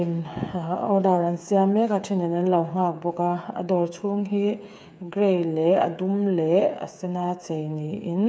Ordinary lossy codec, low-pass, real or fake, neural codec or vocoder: none; none; fake; codec, 16 kHz, 8 kbps, FreqCodec, smaller model